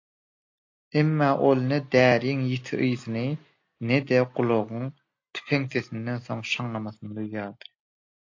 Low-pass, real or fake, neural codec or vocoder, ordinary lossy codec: 7.2 kHz; real; none; AAC, 48 kbps